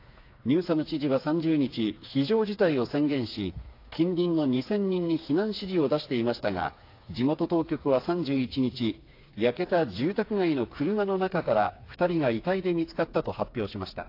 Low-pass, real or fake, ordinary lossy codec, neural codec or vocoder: 5.4 kHz; fake; AAC, 32 kbps; codec, 16 kHz, 4 kbps, FreqCodec, smaller model